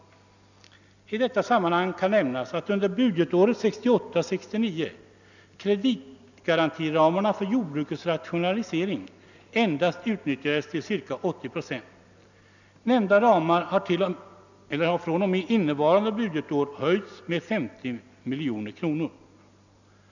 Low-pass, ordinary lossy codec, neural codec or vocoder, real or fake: 7.2 kHz; none; none; real